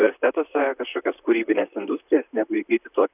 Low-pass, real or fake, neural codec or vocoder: 3.6 kHz; fake; vocoder, 44.1 kHz, 128 mel bands, Pupu-Vocoder